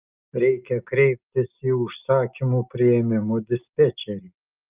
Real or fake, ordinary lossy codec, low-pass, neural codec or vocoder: real; Opus, 24 kbps; 3.6 kHz; none